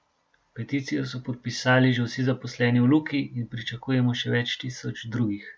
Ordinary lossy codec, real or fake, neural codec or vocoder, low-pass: none; real; none; none